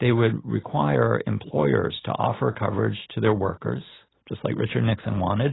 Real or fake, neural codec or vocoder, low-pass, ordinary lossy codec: real; none; 7.2 kHz; AAC, 16 kbps